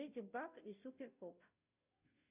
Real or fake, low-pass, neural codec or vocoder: fake; 3.6 kHz; codec, 16 kHz, 0.5 kbps, FunCodec, trained on Chinese and English, 25 frames a second